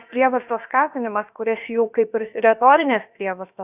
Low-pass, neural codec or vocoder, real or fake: 3.6 kHz; codec, 16 kHz, about 1 kbps, DyCAST, with the encoder's durations; fake